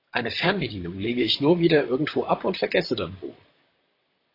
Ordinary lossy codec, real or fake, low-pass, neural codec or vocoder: AAC, 24 kbps; fake; 5.4 kHz; vocoder, 44.1 kHz, 128 mel bands, Pupu-Vocoder